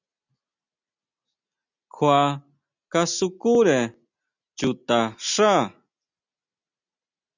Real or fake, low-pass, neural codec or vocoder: real; 7.2 kHz; none